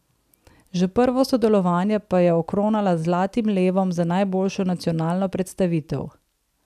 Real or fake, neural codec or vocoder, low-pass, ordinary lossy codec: real; none; 14.4 kHz; none